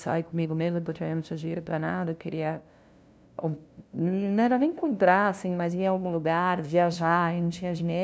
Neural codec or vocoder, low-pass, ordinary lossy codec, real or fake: codec, 16 kHz, 0.5 kbps, FunCodec, trained on LibriTTS, 25 frames a second; none; none; fake